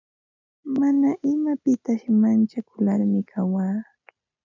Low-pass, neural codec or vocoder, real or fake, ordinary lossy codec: 7.2 kHz; none; real; MP3, 64 kbps